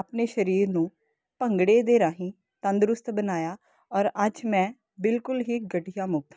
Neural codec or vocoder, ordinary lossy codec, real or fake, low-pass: none; none; real; none